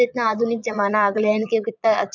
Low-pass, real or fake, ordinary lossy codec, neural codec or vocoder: 7.2 kHz; fake; none; vocoder, 22.05 kHz, 80 mel bands, Vocos